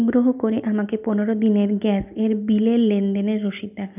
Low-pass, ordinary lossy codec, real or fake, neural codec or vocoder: 3.6 kHz; none; real; none